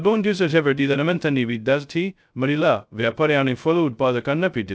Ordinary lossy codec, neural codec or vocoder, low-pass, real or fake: none; codec, 16 kHz, 0.2 kbps, FocalCodec; none; fake